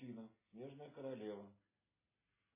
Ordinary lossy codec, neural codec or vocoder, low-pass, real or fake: MP3, 16 kbps; none; 3.6 kHz; real